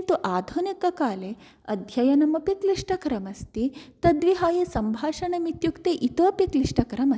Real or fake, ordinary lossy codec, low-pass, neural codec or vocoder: real; none; none; none